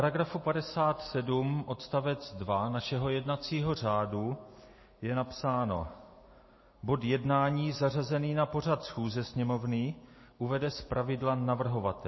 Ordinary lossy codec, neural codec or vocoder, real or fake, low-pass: MP3, 24 kbps; none; real; 7.2 kHz